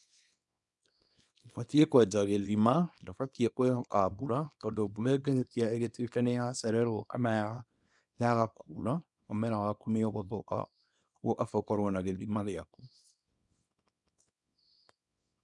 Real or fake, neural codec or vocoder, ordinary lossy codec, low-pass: fake; codec, 24 kHz, 0.9 kbps, WavTokenizer, small release; none; 10.8 kHz